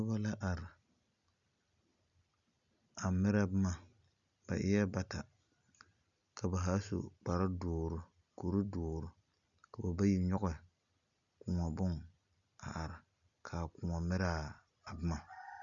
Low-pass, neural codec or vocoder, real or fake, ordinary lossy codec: 7.2 kHz; none; real; MP3, 96 kbps